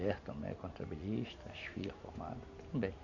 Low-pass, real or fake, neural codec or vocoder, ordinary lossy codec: 7.2 kHz; real; none; AAC, 48 kbps